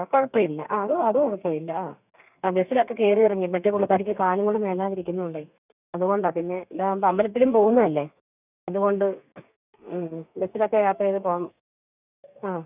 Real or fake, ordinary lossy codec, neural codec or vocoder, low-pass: fake; none; codec, 32 kHz, 1.9 kbps, SNAC; 3.6 kHz